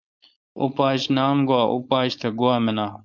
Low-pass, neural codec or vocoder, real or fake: 7.2 kHz; codec, 16 kHz, 4.8 kbps, FACodec; fake